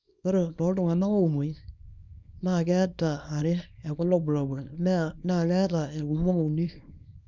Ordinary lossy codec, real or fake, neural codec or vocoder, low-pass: none; fake; codec, 24 kHz, 0.9 kbps, WavTokenizer, small release; 7.2 kHz